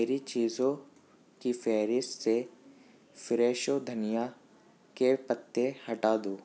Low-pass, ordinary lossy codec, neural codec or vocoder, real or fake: none; none; none; real